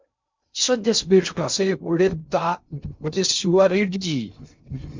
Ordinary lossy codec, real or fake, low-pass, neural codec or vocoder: MP3, 64 kbps; fake; 7.2 kHz; codec, 16 kHz in and 24 kHz out, 0.6 kbps, FocalCodec, streaming, 2048 codes